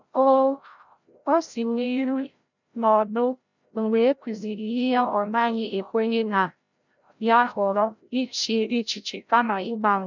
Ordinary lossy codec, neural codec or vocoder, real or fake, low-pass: none; codec, 16 kHz, 0.5 kbps, FreqCodec, larger model; fake; 7.2 kHz